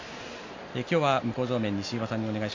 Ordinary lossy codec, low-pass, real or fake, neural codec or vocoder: MP3, 48 kbps; 7.2 kHz; real; none